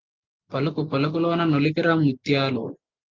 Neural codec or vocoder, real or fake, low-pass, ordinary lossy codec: none; real; 7.2 kHz; Opus, 32 kbps